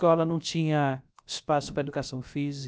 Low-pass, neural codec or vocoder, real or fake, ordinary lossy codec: none; codec, 16 kHz, about 1 kbps, DyCAST, with the encoder's durations; fake; none